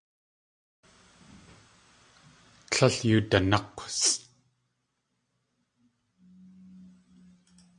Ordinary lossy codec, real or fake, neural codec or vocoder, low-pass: AAC, 64 kbps; real; none; 9.9 kHz